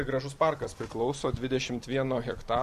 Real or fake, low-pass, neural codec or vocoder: real; 14.4 kHz; none